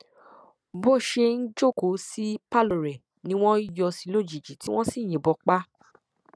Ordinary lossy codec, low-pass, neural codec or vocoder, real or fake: none; none; none; real